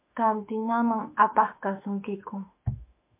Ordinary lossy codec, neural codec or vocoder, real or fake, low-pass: MP3, 24 kbps; autoencoder, 48 kHz, 32 numbers a frame, DAC-VAE, trained on Japanese speech; fake; 3.6 kHz